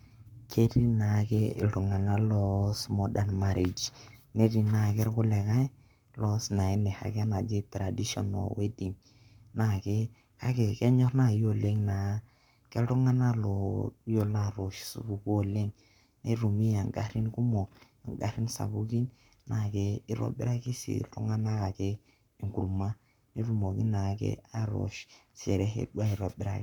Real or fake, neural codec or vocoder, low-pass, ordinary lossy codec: fake; codec, 44.1 kHz, 7.8 kbps, Pupu-Codec; 19.8 kHz; none